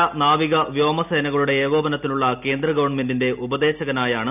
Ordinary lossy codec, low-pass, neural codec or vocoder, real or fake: none; 3.6 kHz; none; real